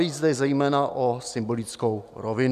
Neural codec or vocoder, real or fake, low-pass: none; real; 14.4 kHz